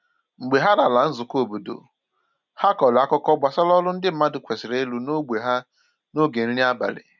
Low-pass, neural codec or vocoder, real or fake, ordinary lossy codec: 7.2 kHz; none; real; none